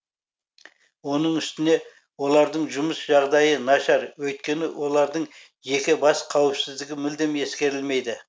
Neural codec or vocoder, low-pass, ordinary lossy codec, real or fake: none; none; none; real